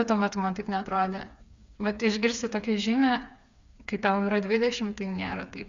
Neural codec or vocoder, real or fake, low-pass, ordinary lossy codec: codec, 16 kHz, 4 kbps, FreqCodec, smaller model; fake; 7.2 kHz; Opus, 64 kbps